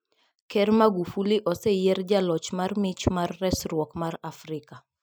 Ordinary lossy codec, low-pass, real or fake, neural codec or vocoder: none; none; real; none